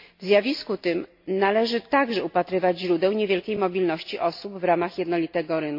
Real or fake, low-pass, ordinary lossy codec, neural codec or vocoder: real; 5.4 kHz; MP3, 32 kbps; none